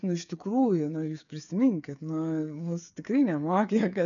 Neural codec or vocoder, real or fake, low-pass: codec, 16 kHz, 8 kbps, FreqCodec, smaller model; fake; 7.2 kHz